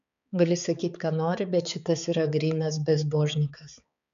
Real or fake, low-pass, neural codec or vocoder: fake; 7.2 kHz; codec, 16 kHz, 4 kbps, X-Codec, HuBERT features, trained on balanced general audio